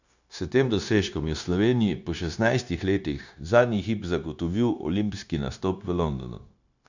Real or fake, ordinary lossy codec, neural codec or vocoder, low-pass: fake; none; codec, 16 kHz, 0.9 kbps, LongCat-Audio-Codec; 7.2 kHz